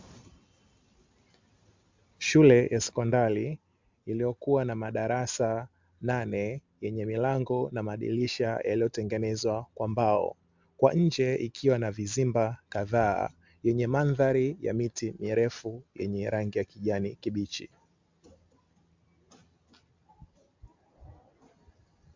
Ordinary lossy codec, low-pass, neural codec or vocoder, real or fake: MP3, 64 kbps; 7.2 kHz; none; real